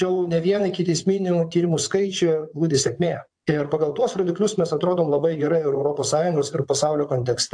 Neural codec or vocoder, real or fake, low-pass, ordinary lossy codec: vocoder, 22.05 kHz, 80 mel bands, WaveNeXt; fake; 9.9 kHz; AAC, 64 kbps